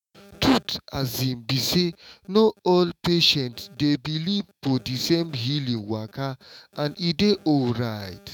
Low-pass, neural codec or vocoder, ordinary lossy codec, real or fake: 19.8 kHz; autoencoder, 48 kHz, 128 numbers a frame, DAC-VAE, trained on Japanese speech; none; fake